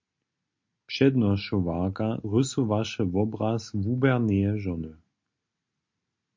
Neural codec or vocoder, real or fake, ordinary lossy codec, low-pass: none; real; MP3, 48 kbps; 7.2 kHz